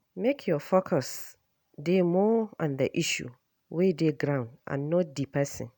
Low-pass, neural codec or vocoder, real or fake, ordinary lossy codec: none; none; real; none